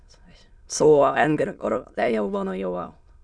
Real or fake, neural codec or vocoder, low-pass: fake; autoencoder, 22.05 kHz, a latent of 192 numbers a frame, VITS, trained on many speakers; 9.9 kHz